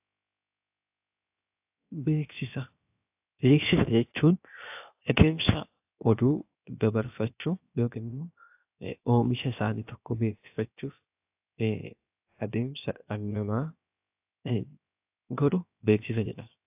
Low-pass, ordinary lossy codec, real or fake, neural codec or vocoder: 3.6 kHz; AAC, 32 kbps; fake; codec, 16 kHz, 0.7 kbps, FocalCodec